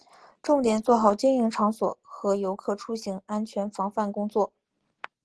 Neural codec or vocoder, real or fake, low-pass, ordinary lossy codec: none; real; 10.8 kHz; Opus, 16 kbps